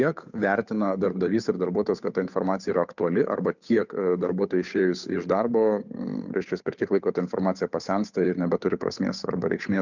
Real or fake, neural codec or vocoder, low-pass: fake; codec, 16 kHz, 8 kbps, FunCodec, trained on Chinese and English, 25 frames a second; 7.2 kHz